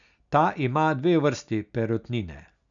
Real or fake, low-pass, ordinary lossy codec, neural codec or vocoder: real; 7.2 kHz; none; none